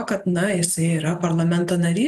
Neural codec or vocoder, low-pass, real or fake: none; 14.4 kHz; real